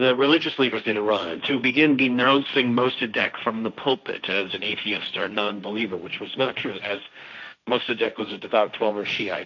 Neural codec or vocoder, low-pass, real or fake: codec, 16 kHz, 1.1 kbps, Voila-Tokenizer; 7.2 kHz; fake